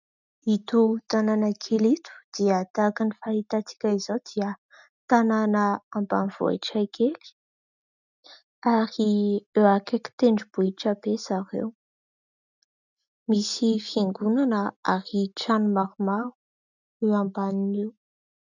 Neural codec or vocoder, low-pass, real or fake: none; 7.2 kHz; real